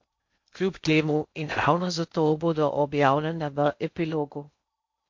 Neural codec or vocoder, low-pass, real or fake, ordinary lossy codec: codec, 16 kHz in and 24 kHz out, 0.6 kbps, FocalCodec, streaming, 2048 codes; 7.2 kHz; fake; MP3, 48 kbps